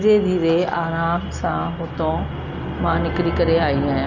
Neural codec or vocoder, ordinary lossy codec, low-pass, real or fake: none; none; 7.2 kHz; real